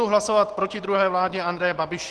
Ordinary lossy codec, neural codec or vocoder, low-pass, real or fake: Opus, 16 kbps; none; 10.8 kHz; real